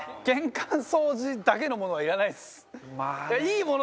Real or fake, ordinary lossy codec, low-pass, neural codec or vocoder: real; none; none; none